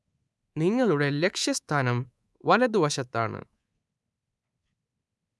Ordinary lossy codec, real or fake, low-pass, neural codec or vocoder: none; fake; none; codec, 24 kHz, 3.1 kbps, DualCodec